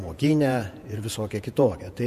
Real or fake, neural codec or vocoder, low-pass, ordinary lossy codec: fake; vocoder, 48 kHz, 128 mel bands, Vocos; 14.4 kHz; MP3, 64 kbps